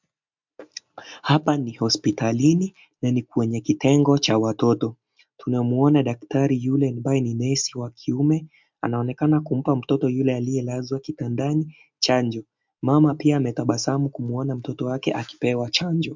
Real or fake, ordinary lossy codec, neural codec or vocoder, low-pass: real; MP3, 64 kbps; none; 7.2 kHz